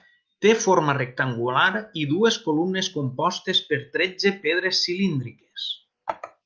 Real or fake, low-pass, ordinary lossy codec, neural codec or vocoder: real; 7.2 kHz; Opus, 24 kbps; none